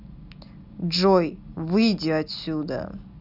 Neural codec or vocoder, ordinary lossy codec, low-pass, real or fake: none; none; 5.4 kHz; real